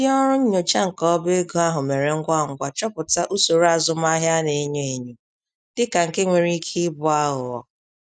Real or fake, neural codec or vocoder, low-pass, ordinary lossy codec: real; none; 9.9 kHz; none